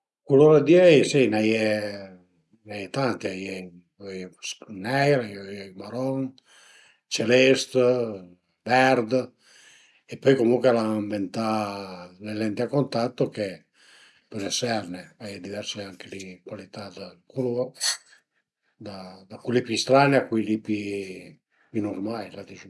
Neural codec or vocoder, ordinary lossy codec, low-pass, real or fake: none; none; none; real